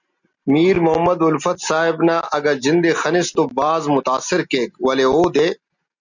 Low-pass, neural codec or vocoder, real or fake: 7.2 kHz; none; real